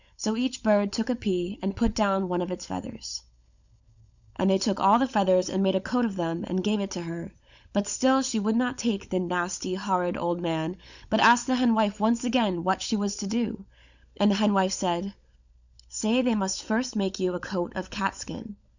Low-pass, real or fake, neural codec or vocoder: 7.2 kHz; fake; codec, 16 kHz, 16 kbps, FunCodec, trained on LibriTTS, 50 frames a second